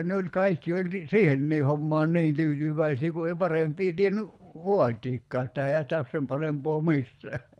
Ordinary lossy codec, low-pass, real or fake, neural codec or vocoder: none; none; fake; codec, 24 kHz, 3 kbps, HILCodec